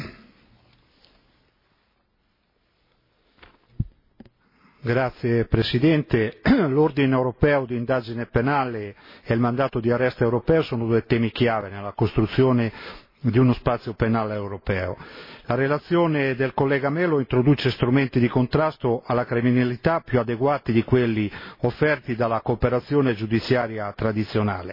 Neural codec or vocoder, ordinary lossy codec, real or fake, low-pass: none; MP3, 24 kbps; real; 5.4 kHz